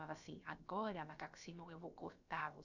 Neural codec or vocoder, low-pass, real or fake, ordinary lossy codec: codec, 16 kHz, about 1 kbps, DyCAST, with the encoder's durations; 7.2 kHz; fake; none